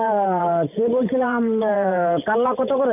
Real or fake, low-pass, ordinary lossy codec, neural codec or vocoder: fake; 3.6 kHz; none; vocoder, 44.1 kHz, 128 mel bands every 512 samples, BigVGAN v2